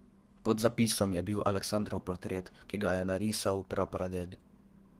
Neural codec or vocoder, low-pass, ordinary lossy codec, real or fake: codec, 32 kHz, 1.9 kbps, SNAC; 14.4 kHz; Opus, 24 kbps; fake